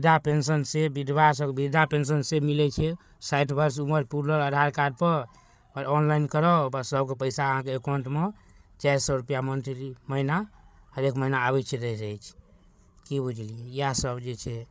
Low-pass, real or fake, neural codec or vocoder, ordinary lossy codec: none; fake; codec, 16 kHz, 8 kbps, FreqCodec, larger model; none